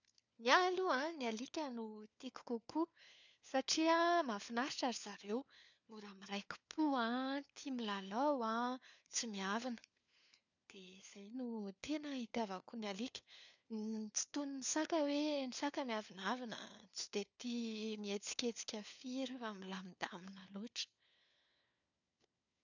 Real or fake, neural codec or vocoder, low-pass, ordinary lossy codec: fake; codec, 16 kHz, 4 kbps, FunCodec, trained on LibriTTS, 50 frames a second; 7.2 kHz; none